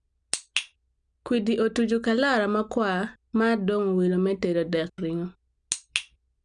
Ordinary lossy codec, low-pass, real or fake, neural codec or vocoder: none; 9.9 kHz; real; none